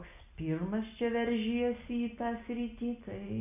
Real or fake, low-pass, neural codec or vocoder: real; 3.6 kHz; none